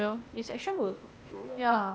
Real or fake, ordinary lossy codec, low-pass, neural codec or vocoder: fake; none; none; codec, 16 kHz, 0.8 kbps, ZipCodec